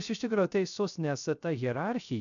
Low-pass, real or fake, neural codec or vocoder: 7.2 kHz; fake; codec, 16 kHz, 0.3 kbps, FocalCodec